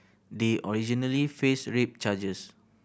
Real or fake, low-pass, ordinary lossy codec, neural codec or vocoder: real; none; none; none